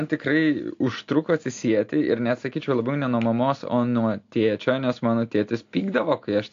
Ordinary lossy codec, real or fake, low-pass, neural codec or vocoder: AAC, 48 kbps; real; 7.2 kHz; none